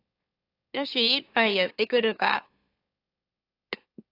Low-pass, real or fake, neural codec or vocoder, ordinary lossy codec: 5.4 kHz; fake; autoencoder, 44.1 kHz, a latent of 192 numbers a frame, MeloTTS; AAC, 32 kbps